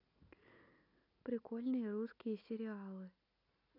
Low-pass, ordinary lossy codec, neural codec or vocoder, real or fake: 5.4 kHz; none; none; real